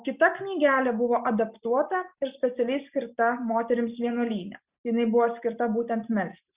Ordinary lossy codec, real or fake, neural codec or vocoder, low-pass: Opus, 64 kbps; real; none; 3.6 kHz